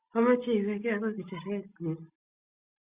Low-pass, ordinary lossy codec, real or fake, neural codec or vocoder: 3.6 kHz; Opus, 64 kbps; real; none